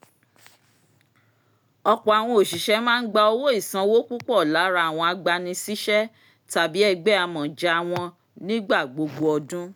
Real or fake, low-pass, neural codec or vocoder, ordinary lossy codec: real; none; none; none